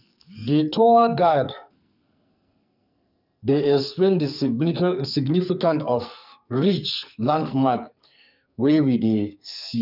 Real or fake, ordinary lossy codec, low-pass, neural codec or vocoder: fake; none; 5.4 kHz; codec, 44.1 kHz, 2.6 kbps, SNAC